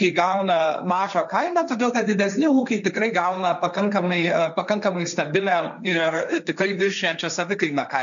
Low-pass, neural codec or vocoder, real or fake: 7.2 kHz; codec, 16 kHz, 1.1 kbps, Voila-Tokenizer; fake